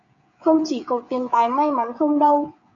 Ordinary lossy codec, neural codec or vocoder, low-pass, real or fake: MP3, 48 kbps; codec, 16 kHz, 16 kbps, FreqCodec, smaller model; 7.2 kHz; fake